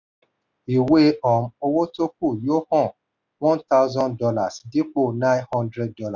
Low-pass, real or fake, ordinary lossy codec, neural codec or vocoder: 7.2 kHz; real; none; none